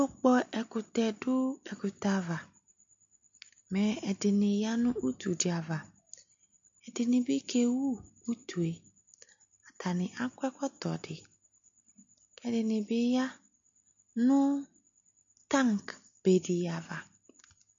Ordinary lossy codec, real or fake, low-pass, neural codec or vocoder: MP3, 48 kbps; real; 7.2 kHz; none